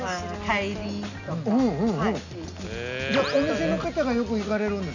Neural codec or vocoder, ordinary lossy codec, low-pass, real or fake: none; none; 7.2 kHz; real